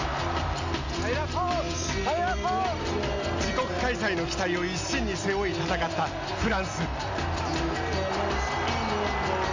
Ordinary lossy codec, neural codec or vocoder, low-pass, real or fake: AAC, 48 kbps; none; 7.2 kHz; real